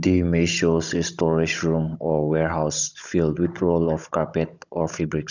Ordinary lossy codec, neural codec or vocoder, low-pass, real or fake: none; codec, 16 kHz, 16 kbps, FunCodec, trained on Chinese and English, 50 frames a second; 7.2 kHz; fake